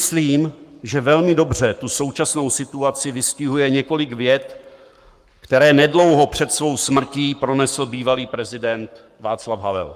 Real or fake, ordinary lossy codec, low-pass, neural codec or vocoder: fake; Opus, 32 kbps; 14.4 kHz; codec, 44.1 kHz, 7.8 kbps, Pupu-Codec